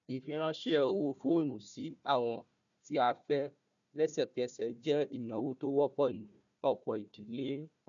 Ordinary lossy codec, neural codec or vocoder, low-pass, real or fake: none; codec, 16 kHz, 1 kbps, FunCodec, trained on Chinese and English, 50 frames a second; 7.2 kHz; fake